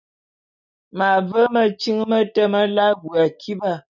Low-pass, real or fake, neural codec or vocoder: 7.2 kHz; real; none